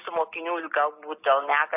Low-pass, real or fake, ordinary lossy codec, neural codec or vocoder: 3.6 kHz; real; AAC, 32 kbps; none